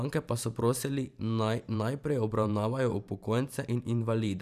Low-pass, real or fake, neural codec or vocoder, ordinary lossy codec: 14.4 kHz; fake; vocoder, 44.1 kHz, 128 mel bands every 512 samples, BigVGAN v2; none